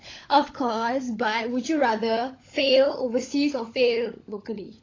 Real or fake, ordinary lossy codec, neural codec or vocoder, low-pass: fake; AAC, 32 kbps; codec, 16 kHz, 16 kbps, FunCodec, trained on LibriTTS, 50 frames a second; 7.2 kHz